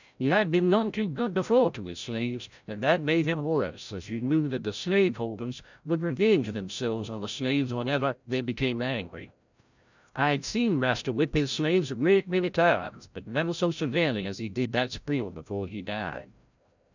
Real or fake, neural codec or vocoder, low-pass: fake; codec, 16 kHz, 0.5 kbps, FreqCodec, larger model; 7.2 kHz